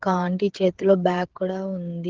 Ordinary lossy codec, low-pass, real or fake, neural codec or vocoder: Opus, 16 kbps; 7.2 kHz; fake; codec, 16 kHz, 8 kbps, FreqCodec, smaller model